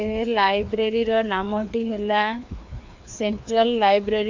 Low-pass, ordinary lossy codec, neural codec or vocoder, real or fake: 7.2 kHz; MP3, 48 kbps; codec, 16 kHz, 2 kbps, FreqCodec, larger model; fake